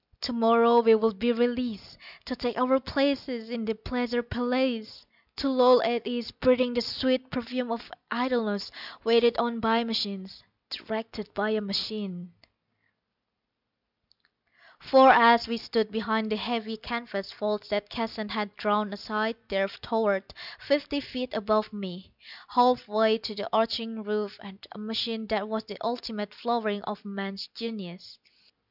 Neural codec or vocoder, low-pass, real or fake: none; 5.4 kHz; real